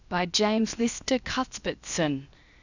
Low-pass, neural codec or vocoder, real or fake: 7.2 kHz; codec, 16 kHz, 0.7 kbps, FocalCodec; fake